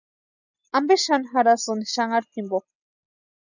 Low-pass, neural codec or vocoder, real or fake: 7.2 kHz; none; real